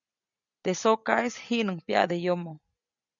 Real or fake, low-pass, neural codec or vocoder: real; 7.2 kHz; none